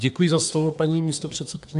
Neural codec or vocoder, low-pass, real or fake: codec, 24 kHz, 1 kbps, SNAC; 10.8 kHz; fake